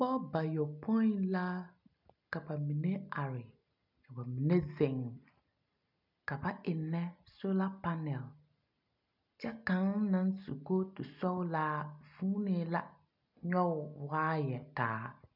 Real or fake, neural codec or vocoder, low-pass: real; none; 5.4 kHz